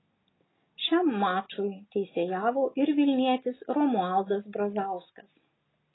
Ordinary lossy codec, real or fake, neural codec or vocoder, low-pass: AAC, 16 kbps; real; none; 7.2 kHz